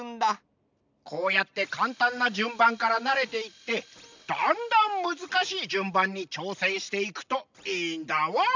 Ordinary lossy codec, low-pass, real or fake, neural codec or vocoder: MP3, 64 kbps; 7.2 kHz; real; none